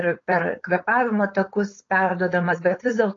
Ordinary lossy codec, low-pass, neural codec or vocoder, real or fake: AAC, 32 kbps; 7.2 kHz; codec, 16 kHz, 4.8 kbps, FACodec; fake